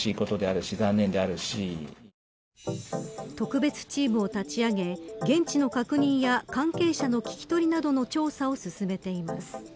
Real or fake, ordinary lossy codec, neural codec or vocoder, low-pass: real; none; none; none